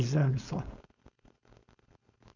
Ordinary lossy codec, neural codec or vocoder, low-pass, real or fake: none; codec, 16 kHz, 4.8 kbps, FACodec; 7.2 kHz; fake